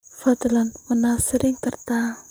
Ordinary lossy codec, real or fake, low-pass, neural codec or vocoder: none; fake; none; vocoder, 44.1 kHz, 128 mel bands, Pupu-Vocoder